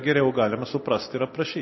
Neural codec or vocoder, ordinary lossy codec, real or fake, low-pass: none; MP3, 24 kbps; real; 7.2 kHz